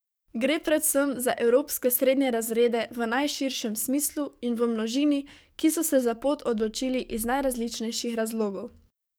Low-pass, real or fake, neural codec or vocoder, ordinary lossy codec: none; fake; codec, 44.1 kHz, 7.8 kbps, DAC; none